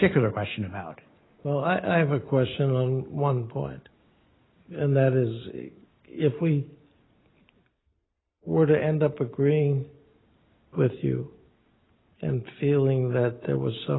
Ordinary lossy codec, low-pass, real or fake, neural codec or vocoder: AAC, 16 kbps; 7.2 kHz; real; none